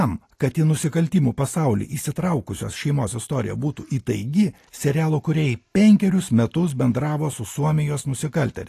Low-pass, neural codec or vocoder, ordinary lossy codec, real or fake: 14.4 kHz; none; AAC, 48 kbps; real